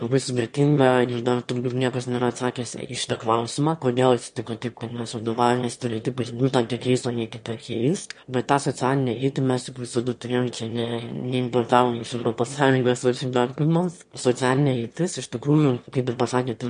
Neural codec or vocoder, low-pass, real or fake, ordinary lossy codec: autoencoder, 22.05 kHz, a latent of 192 numbers a frame, VITS, trained on one speaker; 9.9 kHz; fake; MP3, 48 kbps